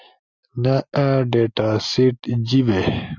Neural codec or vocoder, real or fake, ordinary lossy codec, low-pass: none; real; Opus, 64 kbps; 7.2 kHz